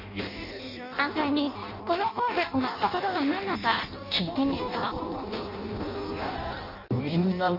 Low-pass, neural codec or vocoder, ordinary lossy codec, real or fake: 5.4 kHz; codec, 16 kHz in and 24 kHz out, 0.6 kbps, FireRedTTS-2 codec; none; fake